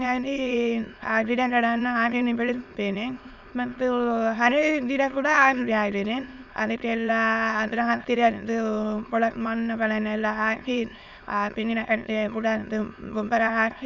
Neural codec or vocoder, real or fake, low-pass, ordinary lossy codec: autoencoder, 22.05 kHz, a latent of 192 numbers a frame, VITS, trained on many speakers; fake; 7.2 kHz; none